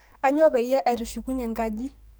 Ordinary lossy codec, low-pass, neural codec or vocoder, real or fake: none; none; codec, 44.1 kHz, 2.6 kbps, SNAC; fake